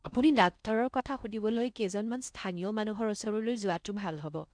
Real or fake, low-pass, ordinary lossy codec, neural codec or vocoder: fake; 9.9 kHz; none; codec, 16 kHz in and 24 kHz out, 0.6 kbps, FocalCodec, streaming, 4096 codes